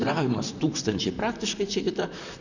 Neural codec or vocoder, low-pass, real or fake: vocoder, 44.1 kHz, 128 mel bands, Pupu-Vocoder; 7.2 kHz; fake